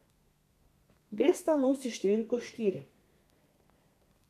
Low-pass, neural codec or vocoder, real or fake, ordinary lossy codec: 14.4 kHz; codec, 32 kHz, 1.9 kbps, SNAC; fake; none